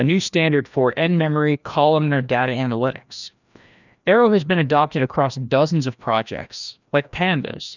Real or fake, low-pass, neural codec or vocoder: fake; 7.2 kHz; codec, 16 kHz, 1 kbps, FreqCodec, larger model